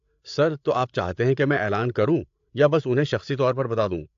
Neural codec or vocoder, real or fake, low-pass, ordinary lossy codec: codec, 16 kHz, 8 kbps, FreqCodec, larger model; fake; 7.2 kHz; AAC, 64 kbps